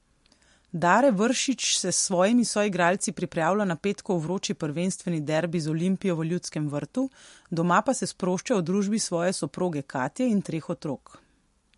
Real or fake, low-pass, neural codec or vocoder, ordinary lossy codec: real; 14.4 kHz; none; MP3, 48 kbps